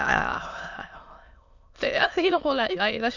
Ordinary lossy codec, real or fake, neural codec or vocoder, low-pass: none; fake; autoencoder, 22.05 kHz, a latent of 192 numbers a frame, VITS, trained on many speakers; 7.2 kHz